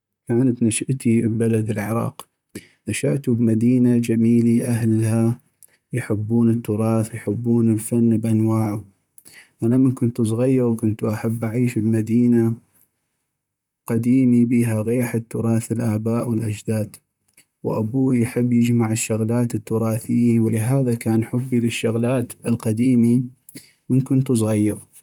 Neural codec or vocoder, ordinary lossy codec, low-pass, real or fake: vocoder, 44.1 kHz, 128 mel bands, Pupu-Vocoder; none; 19.8 kHz; fake